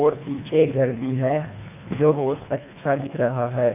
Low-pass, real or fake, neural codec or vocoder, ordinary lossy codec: 3.6 kHz; fake; codec, 24 kHz, 1.5 kbps, HILCodec; none